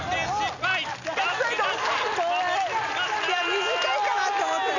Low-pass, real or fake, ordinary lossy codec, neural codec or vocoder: 7.2 kHz; real; none; none